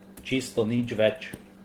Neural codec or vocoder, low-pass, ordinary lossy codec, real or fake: none; 19.8 kHz; Opus, 16 kbps; real